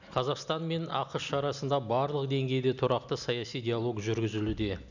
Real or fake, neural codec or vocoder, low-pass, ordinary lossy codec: real; none; 7.2 kHz; none